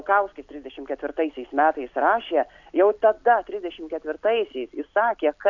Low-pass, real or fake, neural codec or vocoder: 7.2 kHz; real; none